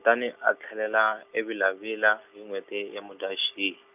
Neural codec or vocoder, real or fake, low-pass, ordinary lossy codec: none; real; 3.6 kHz; none